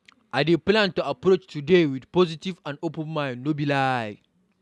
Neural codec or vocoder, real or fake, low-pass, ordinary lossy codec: none; real; none; none